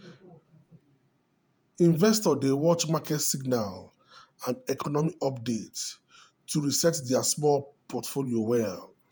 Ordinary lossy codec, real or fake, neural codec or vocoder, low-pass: none; fake; vocoder, 48 kHz, 128 mel bands, Vocos; none